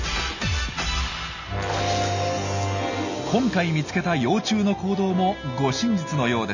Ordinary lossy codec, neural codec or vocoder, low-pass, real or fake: none; none; 7.2 kHz; real